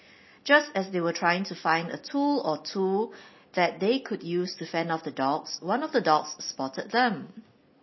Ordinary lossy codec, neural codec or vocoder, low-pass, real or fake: MP3, 24 kbps; none; 7.2 kHz; real